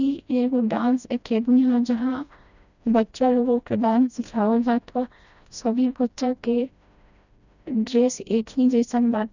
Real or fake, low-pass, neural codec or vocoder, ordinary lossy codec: fake; 7.2 kHz; codec, 16 kHz, 1 kbps, FreqCodec, smaller model; none